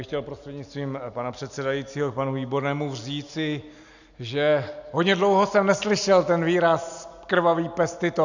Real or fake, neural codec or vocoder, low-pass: real; none; 7.2 kHz